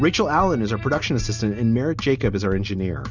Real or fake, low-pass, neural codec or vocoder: real; 7.2 kHz; none